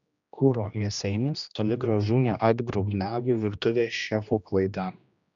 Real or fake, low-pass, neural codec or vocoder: fake; 7.2 kHz; codec, 16 kHz, 1 kbps, X-Codec, HuBERT features, trained on general audio